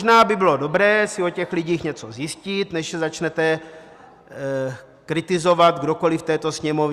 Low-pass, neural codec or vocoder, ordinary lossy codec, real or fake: 14.4 kHz; none; Opus, 64 kbps; real